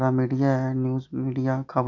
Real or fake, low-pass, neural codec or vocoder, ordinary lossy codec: real; 7.2 kHz; none; none